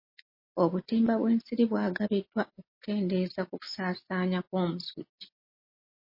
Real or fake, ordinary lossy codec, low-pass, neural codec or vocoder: real; MP3, 24 kbps; 5.4 kHz; none